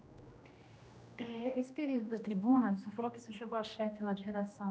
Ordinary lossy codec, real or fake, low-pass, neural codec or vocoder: none; fake; none; codec, 16 kHz, 1 kbps, X-Codec, HuBERT features, trained on general audio